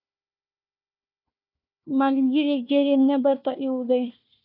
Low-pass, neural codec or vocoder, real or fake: 5.4 kHz; codec, 16 kHz, 1 kbps, FunCodec, trained on Chinese and English, 50 frames a second; fake